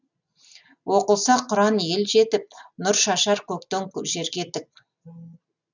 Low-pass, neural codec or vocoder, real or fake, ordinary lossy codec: 7.2 kHz; none; real; none